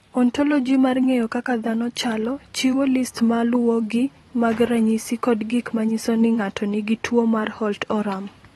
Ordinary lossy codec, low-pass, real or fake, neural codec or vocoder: AAC, 32 kbps; 19.8 kHz; fake; vocoder, 44.1 kHz, 128 mel bands every 512 samples, BigVGAN v2